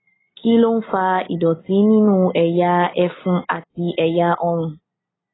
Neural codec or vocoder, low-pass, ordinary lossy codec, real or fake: none; 7.2 kHz; AAC, 16 kbps; real